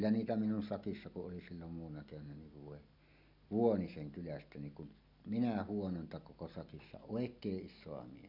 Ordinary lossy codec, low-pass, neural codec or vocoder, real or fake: none; 5.4 kHz; none; real